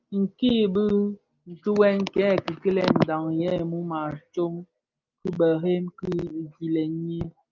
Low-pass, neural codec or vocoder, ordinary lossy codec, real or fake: 7.2 kHz; none; Opus, 24 kbps; real